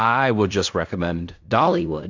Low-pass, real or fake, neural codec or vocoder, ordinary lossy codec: 7.2 kHz; fake; codec, 16 kHz in and 24 kHz out, 0.4 kbps, LongCat-Audio-Codec, fine tuned four codebook decoder; AAC, 48 kbps